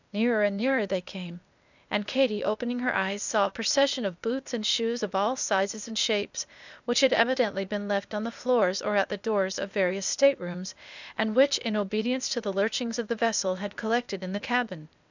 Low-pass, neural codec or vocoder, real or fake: 7.2 kHz; codec, 16 kHz, 0.8 kbps, ZipCodec; fake